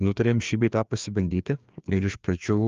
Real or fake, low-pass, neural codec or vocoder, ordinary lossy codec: fake; 7.2 kHz; codec, 16 kHz, 2 kbps, FreqCodec, larger model; Opus, 32 kbps